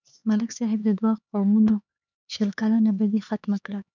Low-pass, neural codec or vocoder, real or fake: 7.2 kHz; codec, 16 kHz, 4 kbps, X-Codec, HuBERT features, trained on LibriSpeech; fake